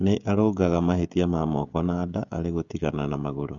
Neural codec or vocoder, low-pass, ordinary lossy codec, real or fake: codec, 16 kHz, 16 kbps, FreqCodec, smaller model; 7.2 kHz; Opus, 64 kbps; fake